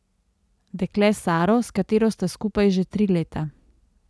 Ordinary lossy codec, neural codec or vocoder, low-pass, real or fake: none; none; none; real